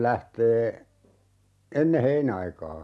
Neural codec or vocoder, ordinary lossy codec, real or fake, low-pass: none; none; real; none